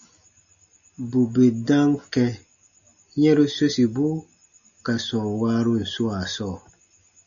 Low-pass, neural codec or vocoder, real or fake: 7.2 kHz; none; real